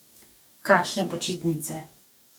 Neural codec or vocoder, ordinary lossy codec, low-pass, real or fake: codec, 44.1 kHz, 2.6 kbps, DAC; none; none; fake